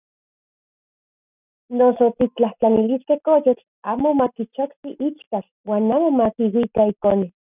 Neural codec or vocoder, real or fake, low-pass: none; real; 3.6 kHz